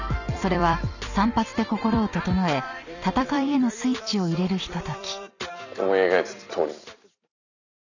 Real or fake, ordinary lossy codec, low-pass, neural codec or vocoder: fake; none; 7.2 kHz; vocoder, 44.1 kHz, 128 mel bands every 256 samples, BigVGAN v2